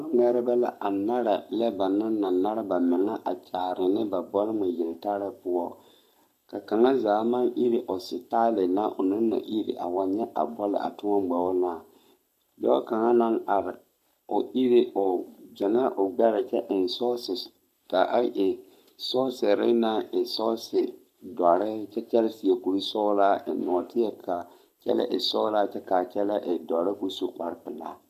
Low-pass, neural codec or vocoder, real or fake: 14.4 kHz; codec, 44.1 kHz, 7.8 kbps, Pupu-Codec; fake